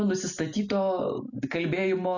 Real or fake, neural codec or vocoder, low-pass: real; none; 7.2 kHz